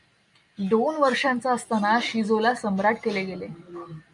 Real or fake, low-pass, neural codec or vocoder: real; 10.8 kHz; none